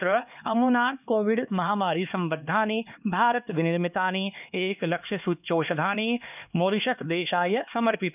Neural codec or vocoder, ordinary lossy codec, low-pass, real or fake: codec, 16 kHz, 2 kbps, X-Codec, HuBERT features, trained on LibriSpeech; none; 3.6 kHz; fake